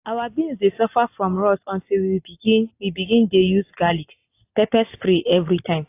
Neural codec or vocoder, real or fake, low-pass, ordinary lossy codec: none; real; 3.6 kHz; AAC, 24 kbps